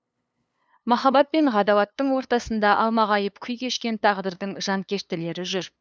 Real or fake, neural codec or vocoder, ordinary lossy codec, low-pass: fake; codec, 16 kHz, 2 kbps, FunCodec, trained on LibriTTS, 25 frames a second; none; none